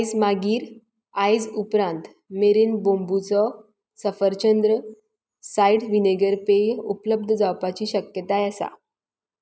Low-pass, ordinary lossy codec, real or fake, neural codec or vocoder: none; none; real; none